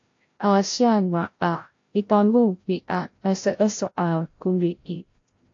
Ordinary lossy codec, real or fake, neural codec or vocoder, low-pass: AAC, 48 kbps; fake; codec, 16 kHz, 0.5 kbps, FreqCodec, larger model; 7.2 kHz